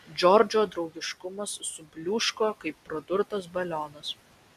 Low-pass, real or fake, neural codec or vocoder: 14.4 kHz; real; none